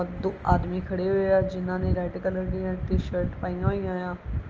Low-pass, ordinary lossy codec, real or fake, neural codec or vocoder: 7.2 kHz; Opus, 24 kbps; real; none